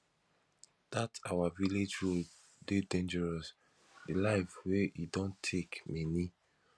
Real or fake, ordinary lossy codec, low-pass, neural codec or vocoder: real; none; none; none